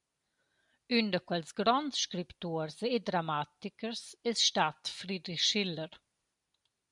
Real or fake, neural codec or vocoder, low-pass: real; none; 10.8 kHz